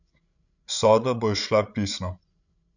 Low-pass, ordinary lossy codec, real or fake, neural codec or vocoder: 7.2 kHz; none; fake; codec, 16 kHz, 16 kbps, FreqCodec, larger model